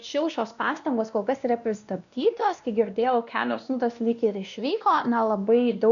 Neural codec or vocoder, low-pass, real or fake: codec, 16 kHz, 1 kbps, X-Codec, WavLM features, trained on Multilingual LibriSpeech; 7.2 kHz; fake